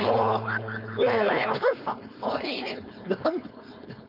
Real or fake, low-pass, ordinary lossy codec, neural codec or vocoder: fake; 5.4 kHz; none; codec, 16 kHz, 4.8 kbps, FACodec